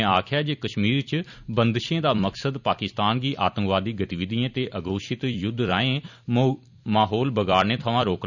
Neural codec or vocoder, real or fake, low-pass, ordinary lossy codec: vocoder, 44.1 kHz, 128 mel bands every 256 samples, BigVGAN v2; fake; 7.2 kHz; none